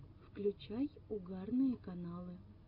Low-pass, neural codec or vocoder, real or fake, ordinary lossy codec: 5.4 kHz; none; real; AAC, 32 kbps